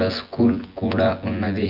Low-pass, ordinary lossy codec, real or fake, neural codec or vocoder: 5.4 kHz; Opus, 32 kbps; fake; vocoder, 24 kHz, 100 mel bands, Vocos